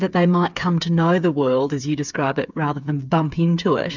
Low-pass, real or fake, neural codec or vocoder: 7.2 kHz; fake; codec, 16 kHz, 8 kbps, FreqCodec, smaller model